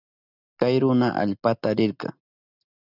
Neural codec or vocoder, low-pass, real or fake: none; 5.4 kHz; real